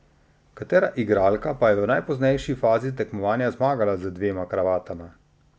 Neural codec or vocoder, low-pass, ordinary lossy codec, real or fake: none; none; none; real